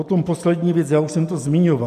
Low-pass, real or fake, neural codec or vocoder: 14.4 kHz; real; none